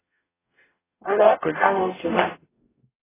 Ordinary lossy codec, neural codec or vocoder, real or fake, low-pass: AAC, 16 kbps; codec, 44.1 kHz, 0.9 kbps, DAC; fake; 3.6 kHz